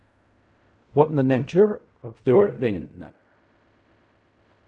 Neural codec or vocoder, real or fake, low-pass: codec, 16 kHz in and 24 kHz out, 0.4 kbps, LongCat-Audio-Codec, fine tuned four codebook decoder; fake; 10.8 kHz